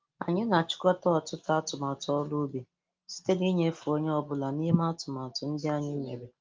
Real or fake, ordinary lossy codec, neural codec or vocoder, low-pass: real; Opus, 32 kbps; none; 7.2 kHz